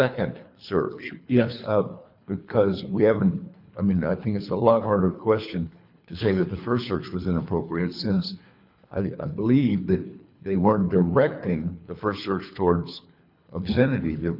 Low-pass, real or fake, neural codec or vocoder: 5.4 kHz; fake; codec, 24 kHz, 3 kbps, HILCodec